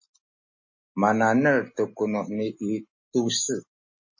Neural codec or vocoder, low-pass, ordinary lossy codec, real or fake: none; 7.2 kHz; MP3, 32 kbps; real